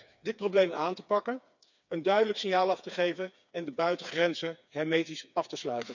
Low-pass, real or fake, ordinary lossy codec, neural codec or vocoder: 7.2 kHz; fake; none; codec, 16 kHz, 4 kbps, FreqCodec, smaller model